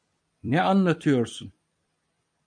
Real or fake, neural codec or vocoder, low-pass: fake; vocoder, 44.1 kHz, 128 mel bands every 256 samples, BigVGAN v2; 9.9 kHz